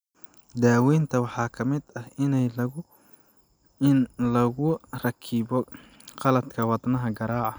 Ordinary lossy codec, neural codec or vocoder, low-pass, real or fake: none; none; none; real